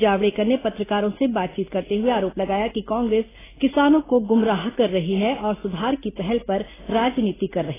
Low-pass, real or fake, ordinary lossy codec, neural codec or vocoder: 3.6 kHz; real; AAC, 16 kbps; none